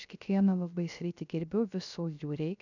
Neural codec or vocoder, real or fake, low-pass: codec, 16 kHz, 0.3 kbps, FocalCodec; fake; 7.2 kHz